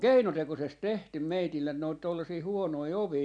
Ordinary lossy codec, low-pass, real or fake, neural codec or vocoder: none; 9.9 kHz; real; none